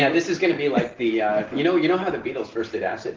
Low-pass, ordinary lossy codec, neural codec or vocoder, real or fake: 7.2 kHz; Opus, 16 kbps; none; real